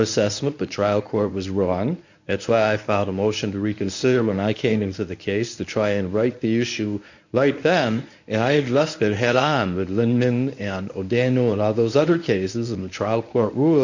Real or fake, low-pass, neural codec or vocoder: fake; 7.2 kHz; codec, 24 kHz, 0.9 kbps, WavTokenizer, medium speech release version 1